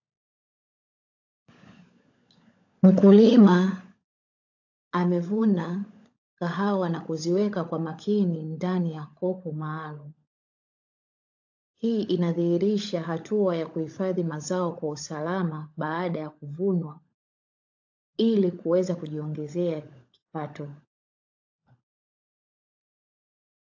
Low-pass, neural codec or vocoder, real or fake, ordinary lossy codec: 7.2 kHz; codec, 16 kHz, 16 kbps, FunCodec, trained on LibriTTS, 50 frames a second; fake; AAC, 48 kbps